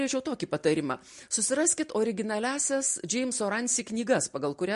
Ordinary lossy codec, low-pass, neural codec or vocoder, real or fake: MP3, 48 kbps; 14.4 kHz; none; real